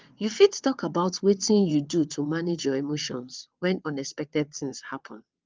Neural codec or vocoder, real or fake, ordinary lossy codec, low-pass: vocoder, 22.05 kHz, 80 mel bands, WaveNeXt; fake; Opus, 24 kbps; 7.2 kHz